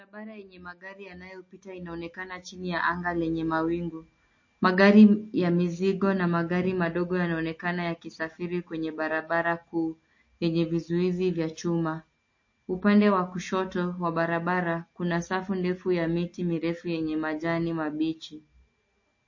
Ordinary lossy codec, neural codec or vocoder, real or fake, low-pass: MP3, 32 kbps; none; real; 7.2 kHz